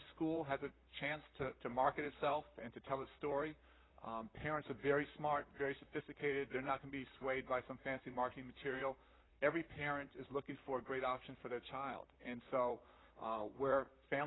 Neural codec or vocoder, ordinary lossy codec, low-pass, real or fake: vocoder, 44.1 kHz, 128 mel bands, Pupu-Vocoder; AAC, 16 kbps; 7.2 kHz; fake